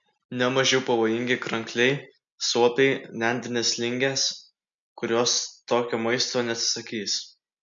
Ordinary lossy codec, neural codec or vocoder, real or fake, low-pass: MP3, 64 kbps; none; real; 7.2 kHz